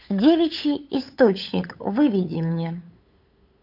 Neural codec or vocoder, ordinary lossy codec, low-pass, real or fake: codec, 16 kHz, 8 kbps, FunCodec, trained on LibriTTS, 25 frames a second; AAC, 48 kbps; 5.4 kHz; fake